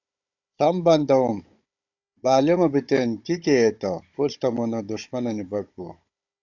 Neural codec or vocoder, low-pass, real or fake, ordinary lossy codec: codec, 16 kHz, 16 kbps, FunCodec, trained on Chinese and English, 50 frames a second; 7.2 kHz; fake; AAC, 48 kbps